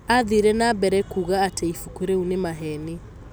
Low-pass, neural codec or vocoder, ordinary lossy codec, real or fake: none; none; none; real